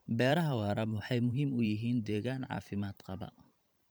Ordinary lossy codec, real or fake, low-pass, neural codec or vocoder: none; fake; none; vocoder, 44.1 kHz, 128 mel bands every 512 samples, BigVGAN v2